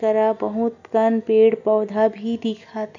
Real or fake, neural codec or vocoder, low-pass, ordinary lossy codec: real; none; 7.2 kHz; none